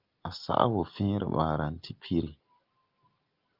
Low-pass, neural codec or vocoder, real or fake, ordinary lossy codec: 5.4 kHz; none; real; Opus, 24 kbps